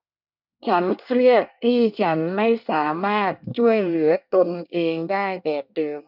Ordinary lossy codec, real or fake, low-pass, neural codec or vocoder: none; fake; 5.4 kHz; codec, 24 kHz, 1 kbps, SNAC